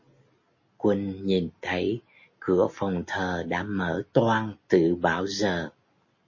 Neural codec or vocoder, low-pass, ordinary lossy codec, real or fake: none; 7.2 kHz; MP3, 32 kbps; real